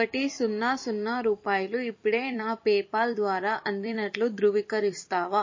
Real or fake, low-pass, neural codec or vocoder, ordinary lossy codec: fake; 7.2 kHz; vocoder, 44.1 kHz, 128 mel bands every 256 samples, BigVGAN v2; MP3, 32 kbps